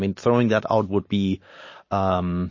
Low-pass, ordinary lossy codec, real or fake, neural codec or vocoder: 7.2 kHz; MP3, 32 kbps; real; none